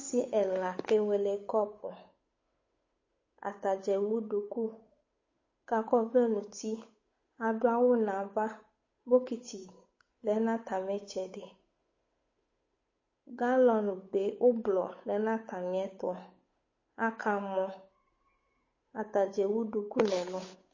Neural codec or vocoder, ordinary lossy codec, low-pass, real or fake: codec, 16 kHz, 8 kbps, FunCodec, trained on Chinese and English, 25 frames a second; MP3, 32 kbps; 7.2 kHz; fake